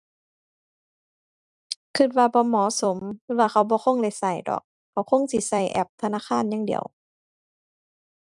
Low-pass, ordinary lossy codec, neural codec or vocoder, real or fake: 10.8 kHz; none; vocoder, 44.1 kHz, 128 mel bands every 256 samples, BigVGAN v2; fake